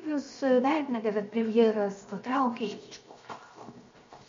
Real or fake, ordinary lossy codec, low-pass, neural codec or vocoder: fake; MP3, 48 kbps; 7.2 kHz; codec, 16 kHz, 0.7 kbps, FocalCodec